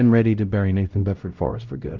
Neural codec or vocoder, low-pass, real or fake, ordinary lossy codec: codec, 16 kHz, 0.5 kbps, X-Codec, WavLM features, trained on Multilingual LibriSpeech; 7.2 kHz; fake; Opus, 32 kbps